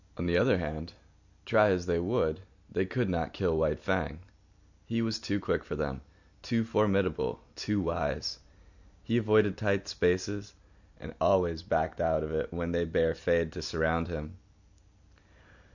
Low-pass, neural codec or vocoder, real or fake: 7.2 kHz; none; real